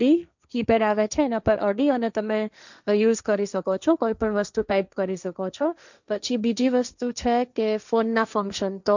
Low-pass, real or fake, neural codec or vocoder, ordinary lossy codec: 7.2 kHz; fake; codec, 16 kHz, 1.1 kbps, Voila-Tokenizer; none